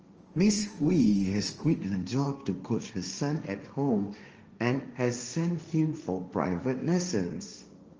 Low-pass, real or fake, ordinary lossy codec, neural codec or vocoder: 7.2 kHz; fake; Opus, 24 kbps; codec, 16 kHz, 1.1 kbps, Voila-Tokenizer